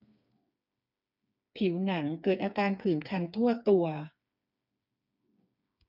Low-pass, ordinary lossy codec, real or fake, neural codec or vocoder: 5.4 kHz; none; fake; codec, 16 kHz, 4 kbps, FreqCodec, smaller model